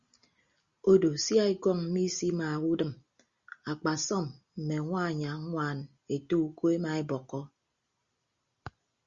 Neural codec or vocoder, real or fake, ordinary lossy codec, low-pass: none; real; Opus, 64 kbps; 7.2 kHz